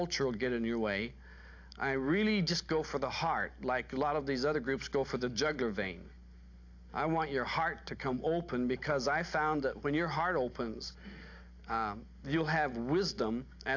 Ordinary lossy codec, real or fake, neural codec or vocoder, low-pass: AAC, 48 kbps; real; none; 7.2 kHz